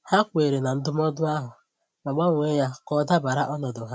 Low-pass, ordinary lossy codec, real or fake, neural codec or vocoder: none; none; real; none